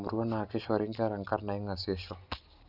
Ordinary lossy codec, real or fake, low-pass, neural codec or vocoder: none; real; 5.4 kHz; none